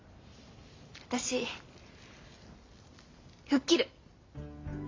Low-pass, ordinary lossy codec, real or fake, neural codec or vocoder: 7.2 kHz; AAC, 32 kbps; real; none